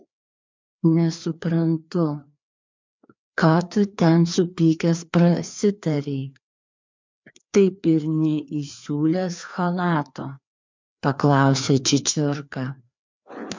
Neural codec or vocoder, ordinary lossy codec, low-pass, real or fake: codec, 16 kHz, 2 kbps, FreqCodec, larger model; MP3, 64 kbps; 7.2 kHz; fake